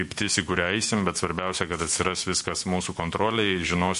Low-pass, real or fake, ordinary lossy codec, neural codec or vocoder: 14.4 kHz; fake; MP3, 48 kbps; autoencoder, 48 kHz, 128 numbers a frame, DAC-VAE, trained on Japanese speech